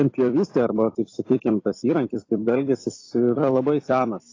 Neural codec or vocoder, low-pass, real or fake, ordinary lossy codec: vocoder, 44.1 kHz, 128 mel bands every 256 samples, BigVGAN v2; 7.2 kHz; fake; AAC, 32 kbps